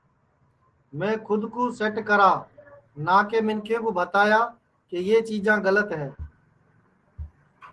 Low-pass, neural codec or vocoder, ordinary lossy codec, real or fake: 10.8 kHz; none; Opus, 16 kbps; real